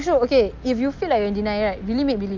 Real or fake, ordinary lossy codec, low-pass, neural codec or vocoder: real; Opus, 24 kbps; 7.2 kHz; none